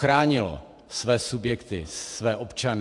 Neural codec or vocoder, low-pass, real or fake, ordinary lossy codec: vocoder, 48 kHz, 128 mel bands, Vocos; 10.8 kHz; fake; AAC, 64 kbps